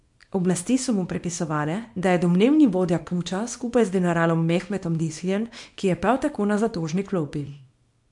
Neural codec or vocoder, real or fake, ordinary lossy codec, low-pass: codec, 24 kHz, 0.9 kbps, WavTokenizer, small release; fake; MP3, 64 kbps; 10.8 kHz